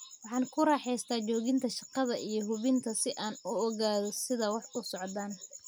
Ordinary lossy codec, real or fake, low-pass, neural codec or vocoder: none; real; none; none